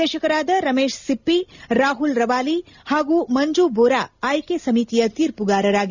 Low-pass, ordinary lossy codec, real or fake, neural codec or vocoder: 7.2 kHz; none; real; none